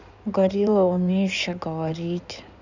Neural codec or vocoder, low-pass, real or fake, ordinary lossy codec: codec, 16 kHz in and 24 kHz out, 2.2 kbps, FireRedTTS-2 codec; 7.2 kHz; fake; none